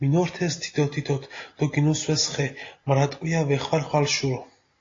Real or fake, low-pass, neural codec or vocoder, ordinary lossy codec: real; 7.2 kHz; none; AAC, 32 kbps